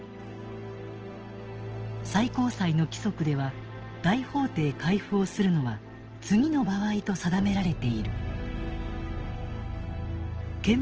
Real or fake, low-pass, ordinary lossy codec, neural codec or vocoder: real; 7.2 kHz; Opus, 16 kbps; none